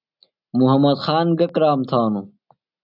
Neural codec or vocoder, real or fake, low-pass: none; real; 5.4 kHz